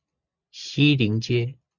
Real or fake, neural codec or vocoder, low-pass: real; none; 7.2 kHz